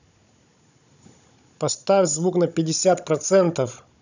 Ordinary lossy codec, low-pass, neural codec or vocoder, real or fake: none; 7.2 kHz; codec, 16 kHz, 16 kbps, FunCodec, trained on Chinese and English, 50 frames a second; fake